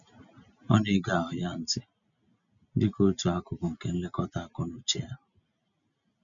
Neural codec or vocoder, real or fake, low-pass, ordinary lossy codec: none; real; 7.2 kHz; none